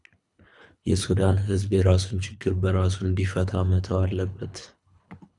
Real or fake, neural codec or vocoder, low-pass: fake; codec, 24 kHz, 3 kbps, HILCodec; 10.8 kHz